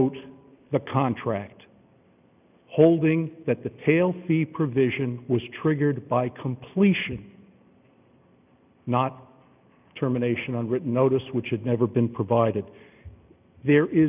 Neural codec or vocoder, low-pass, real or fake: none; 3.6 kHz; real